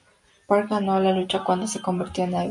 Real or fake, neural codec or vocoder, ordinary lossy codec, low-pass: real; none; MP3, 64 kbps; 10.8 kHz